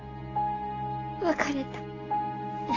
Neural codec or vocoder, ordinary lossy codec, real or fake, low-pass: none; AAC, 32 kbps; real; 7.2 kHz